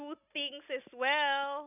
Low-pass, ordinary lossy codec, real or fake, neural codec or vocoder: 3.6 kHz; none; real; none